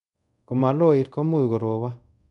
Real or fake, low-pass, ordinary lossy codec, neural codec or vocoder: fake; 10.8 kHz; none; codec, 24 kHz, 0.5 kbps, DualCodec